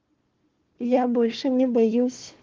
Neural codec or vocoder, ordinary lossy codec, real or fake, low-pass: codec, 16 kHz, 1 kbps, FunCodec, trained on Chinese and English, 50 frames a second; Opus, 16 kbps; fake; 7.2 kHz